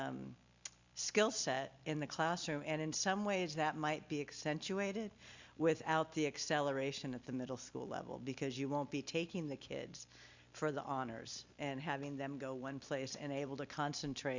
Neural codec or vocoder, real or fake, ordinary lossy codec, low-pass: none; real; Opus, 64 kbps; 7.2 kHz